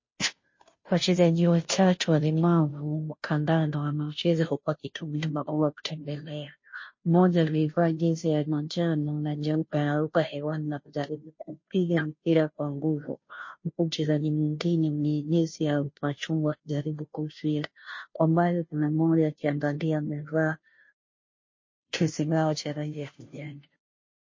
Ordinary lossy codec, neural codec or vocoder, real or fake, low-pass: MP3, 32 kbps; codec, 16 kHz, 0.5 kbps, FunCodec, trained on Chinese and English, 25 frames a second; fake; 7.2 kHz